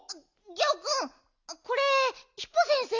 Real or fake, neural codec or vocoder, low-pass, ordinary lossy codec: real; none; 7.2 kHz; Opus, 64 kbps